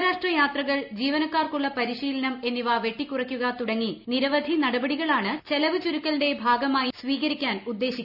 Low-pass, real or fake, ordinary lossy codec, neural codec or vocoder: 5.4 kHz; real; none; none